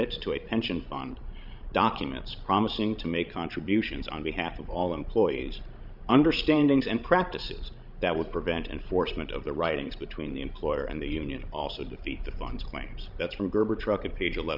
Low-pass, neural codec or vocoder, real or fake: 5.4 kHz; codec, 16 kHz, 16 kbps, FreqCodec, larger model; fake